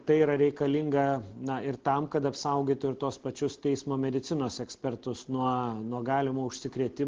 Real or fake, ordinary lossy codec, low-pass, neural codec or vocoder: real; Opus, 16 kbps; 7.2 kHz; none